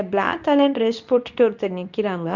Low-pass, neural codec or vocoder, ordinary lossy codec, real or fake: 7.2 kHz; codec, 24 kHz, 0.9 kbps, WavTokenizer, medium speech release version 2; none; fake